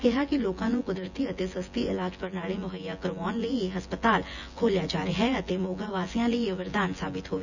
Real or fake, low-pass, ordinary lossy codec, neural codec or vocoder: fake; 7.2 kHz; none; vocoder, 24 kHz, 100 mel bands, Vocos